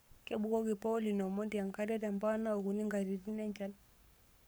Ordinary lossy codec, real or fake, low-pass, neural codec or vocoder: none; fake; none; codec, 44.1 kHz, 7.8 kbps, Pupu-Codec